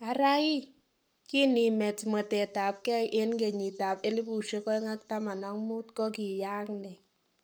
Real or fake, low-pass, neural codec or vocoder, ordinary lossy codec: fake; none; codec, 44.1 kHz, 7.8 kbps, Pupu-Codec; none